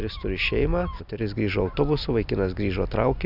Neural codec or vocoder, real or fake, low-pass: none; real; 5.4 kHz